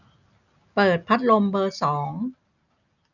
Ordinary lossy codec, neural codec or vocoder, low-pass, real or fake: none; none; 7.2 kHz; real